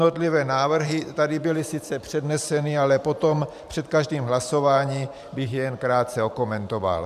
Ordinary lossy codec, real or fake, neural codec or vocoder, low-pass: MP3, 96 kbps; fake; vocoder, 44.1 kHz, 128 mel bands every 512 samples, BigVGAN v2; 14.4 kHz